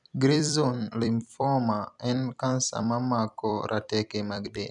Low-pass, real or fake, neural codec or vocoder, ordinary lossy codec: 10.8 kHz; fake; vocoder, 44.1 kHz, 128 mel bands every 256 samples, BigVGAN v2; none